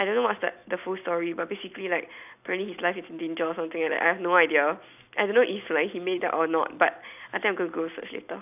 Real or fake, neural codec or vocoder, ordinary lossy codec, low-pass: real; none; none; 3.6 kHz